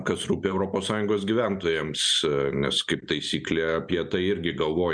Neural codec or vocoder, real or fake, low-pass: none; real; 9.9 kHz